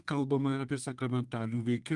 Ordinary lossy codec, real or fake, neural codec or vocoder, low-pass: Opus, 32 kbps; fake; codec, 32 kHz, 1.9 kbps, SNAC; 10.8 kHz